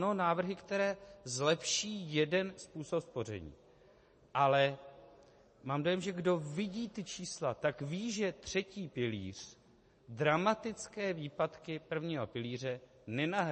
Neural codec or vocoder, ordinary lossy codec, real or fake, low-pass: none; MP3, 32 kbps; real; 10.8 kHz